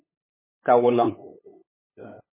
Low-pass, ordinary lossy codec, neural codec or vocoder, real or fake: 3.6 kHz; MP3, 16 kbps; codec, 16 kHz, 8 kbps, FunCodec, trained on LibriTTS, 25 frames a second; fake